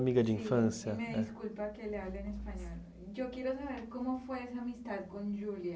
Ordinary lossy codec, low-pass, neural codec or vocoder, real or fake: none; none; none; real